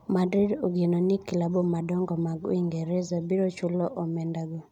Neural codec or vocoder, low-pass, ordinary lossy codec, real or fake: none; 19.8 kHz; none; real